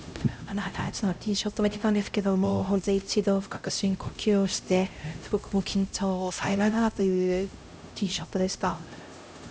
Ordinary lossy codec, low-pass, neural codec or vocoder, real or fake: none; none; codec, 16 kHz, 0.5 kbps, X-Codec, HuBERT features, trained on LibriSpeech; fake